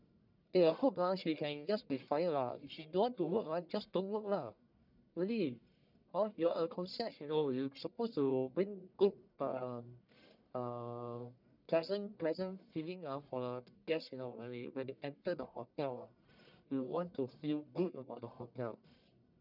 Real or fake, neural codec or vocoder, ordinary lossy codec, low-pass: fake; codec, 44.1 kHz, 1.7 kbps, Pupu-Codec; none; 5.4 kHz